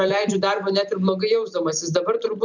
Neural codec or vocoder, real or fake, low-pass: none; real; 7.2 kHz